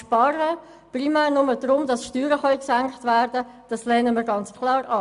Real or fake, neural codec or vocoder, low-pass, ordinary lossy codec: real; none; 10.8 kHz; none